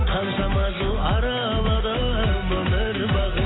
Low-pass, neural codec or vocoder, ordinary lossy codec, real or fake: 7.2 kHz; none; AAC, 16 kbps; real